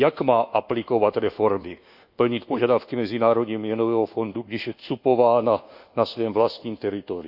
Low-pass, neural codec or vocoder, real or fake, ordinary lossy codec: 5.4 kHz; codec, 24 kHz, 1.2 kbps, DualCodec; fake; Opus, 64 kbps